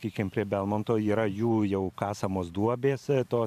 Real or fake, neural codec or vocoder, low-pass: fake; vocoder, 44.1 kHz, 128 mel bands every 512 samples, BigVGAN v2; 14.4 kHz